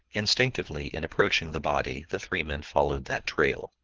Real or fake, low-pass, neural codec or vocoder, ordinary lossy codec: fake; 7.2 kHz; codec, 24 kHz, 3 kbps, HILCodec; Opus, 16 kbps